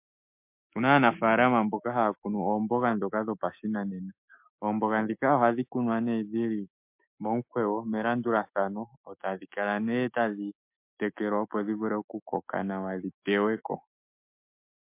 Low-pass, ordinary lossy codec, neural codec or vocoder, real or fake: 3.6 kHz; MP3, 32 kbps; autoencoder, 48 kHz, 128 numbers a frame, DAC-VAE, trained on Japanese speech; fake